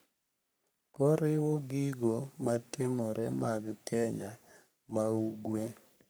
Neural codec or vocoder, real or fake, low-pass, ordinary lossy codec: codec, 44.1 kHz, 3.4 kbps, Pupu-Codec; fake; none; none